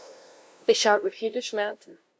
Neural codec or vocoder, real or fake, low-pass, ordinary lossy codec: codec, 16 kHz, 0.5 kbps, FunCodec, trained on LibriTTS, 25 frames a second; fake; none; none